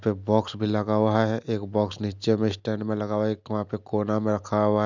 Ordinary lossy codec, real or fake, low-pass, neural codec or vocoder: none; real; 7.2 kHz; none